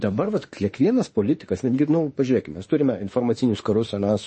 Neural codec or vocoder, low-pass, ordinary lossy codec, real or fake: autoencoder, 48 kHz, 32 numbers a frame, DAC-VAE, trained on Japanese speech; 10.8 kHz; MP3, 32 kbps; fake